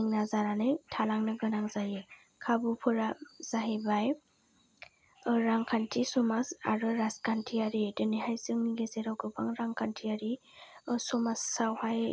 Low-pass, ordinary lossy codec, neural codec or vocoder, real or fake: none; none; none; real